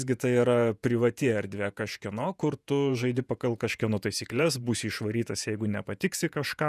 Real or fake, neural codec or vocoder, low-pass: fake; vocoder, 48 kHz, 128 mel bands, Vocos; 14.4 kHz